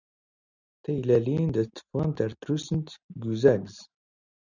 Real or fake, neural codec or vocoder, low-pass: real; none; 7.2 kHz